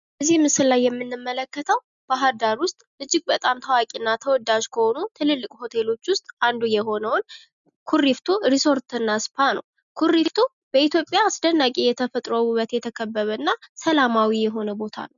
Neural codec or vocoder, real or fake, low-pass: none; real; 7.2 kHz